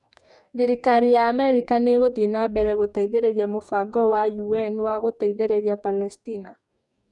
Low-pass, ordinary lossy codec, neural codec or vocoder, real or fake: 10.8 kHz; none; codec, 44.1 kHz, 2.6 kbps, DAC; fake